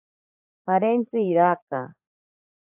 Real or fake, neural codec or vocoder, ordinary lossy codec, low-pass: fake; vocoder, 44.1 kHz, 80 mel bands, Vocos; AAC, 32 kbps; 3.6 kHz